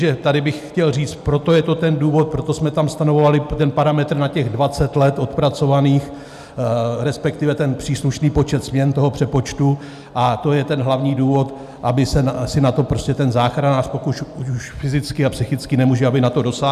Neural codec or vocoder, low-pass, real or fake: none; 14.4 kHz; real